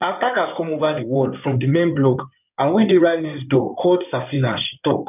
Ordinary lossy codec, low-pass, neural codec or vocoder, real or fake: none; 3.6 kHz; codec, 16 kHz in and 24 kHz out, 2.2 kbps, FireRedTTS-2 codec; fake